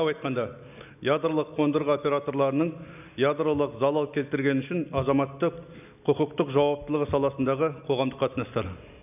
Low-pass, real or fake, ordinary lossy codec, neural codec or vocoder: 3.6 kHz; real; none; none